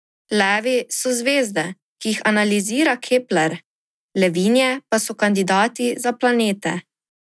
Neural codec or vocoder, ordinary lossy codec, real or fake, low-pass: none; none; real; none